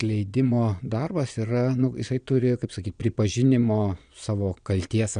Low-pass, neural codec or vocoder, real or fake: 9.9 kHz; vocoder, 22.05 kHz, 80 mel bands, Vocos; fake